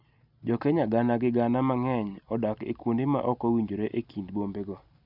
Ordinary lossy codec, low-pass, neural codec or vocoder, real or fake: none; 5.4 kHz; none; real